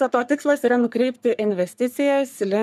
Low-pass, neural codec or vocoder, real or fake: 14.4 kHz; codec, 44.1 kHz, 3.4 kbps, Pupu-Codec; fake